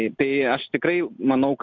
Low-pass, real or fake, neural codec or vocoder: 7.2 kHz; real; none